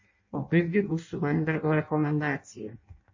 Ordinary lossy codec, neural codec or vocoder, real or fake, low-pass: MP3, 32 kbps; codec, 16 kHz in and 24 kHz out, 0.6 kbps, FireRedTTS-2 codec; fake; 7.2 kHz